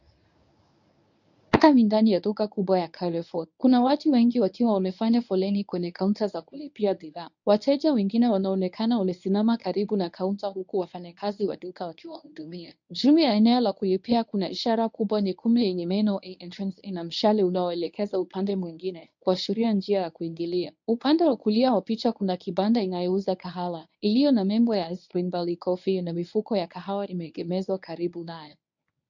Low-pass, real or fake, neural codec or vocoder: 7.2 kHz; fake; codec, 24 kHz, 0.9 kbps, WavTokenizer, medium speech release version 1